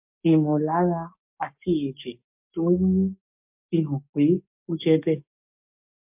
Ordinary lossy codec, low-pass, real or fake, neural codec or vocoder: MP3, 32 kbps; 3.6 kHz; fake; codec, 44.1 kHz, 7.8 kbps, DAC